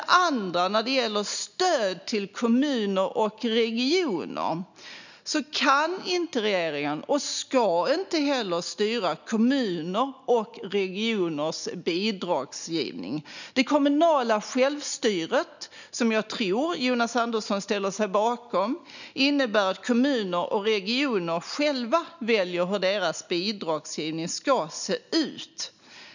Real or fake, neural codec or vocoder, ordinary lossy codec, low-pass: real; none; none; 7.2 kHz